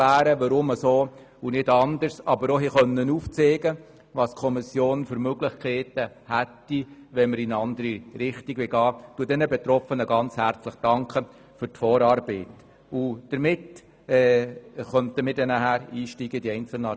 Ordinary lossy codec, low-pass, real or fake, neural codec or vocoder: none; none; real; none